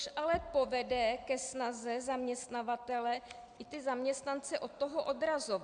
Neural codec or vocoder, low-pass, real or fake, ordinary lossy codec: none; 9.9 kHz; real; MP3, 96 kbps